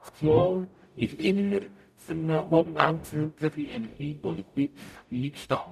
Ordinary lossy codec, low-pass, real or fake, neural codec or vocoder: none; 14.4 kHz; fake; codec, 44.1 kHz, 0.9 kbps, DAC